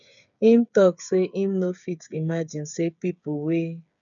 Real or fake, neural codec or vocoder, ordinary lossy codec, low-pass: fake; codec, 16 kHz, 8 kbps, FreqCodec, smaller model; none; 7.2 kHz